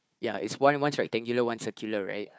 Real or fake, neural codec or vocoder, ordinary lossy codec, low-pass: fake; codec, 16 kHz, 4 kbps, FunCodec, trained on Chinese and English, 50 frames a second; none; none